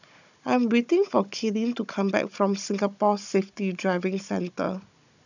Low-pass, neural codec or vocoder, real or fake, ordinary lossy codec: 7.2 kHz; codec, 16 kHz, 16 kbps, FunCodec, trained on Chinese and English, 50 frames a second; fake; none